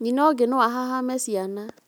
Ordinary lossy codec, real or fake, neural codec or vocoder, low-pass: none; real; none; none